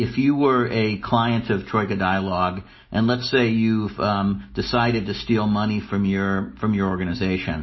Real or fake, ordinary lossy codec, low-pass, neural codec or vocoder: real; MP3, 24 kbps; 7.2 kHz; none